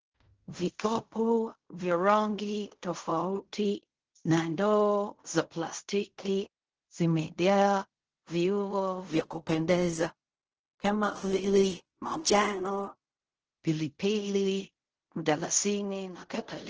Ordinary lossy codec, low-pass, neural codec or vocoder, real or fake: Opus, 16 kbps; 7.2 kHz; codec, 16 kHz in and 24 kHz out, 0.4 kbps, LongCat-Audio-Codec, fine tuned four codebook decoder; fake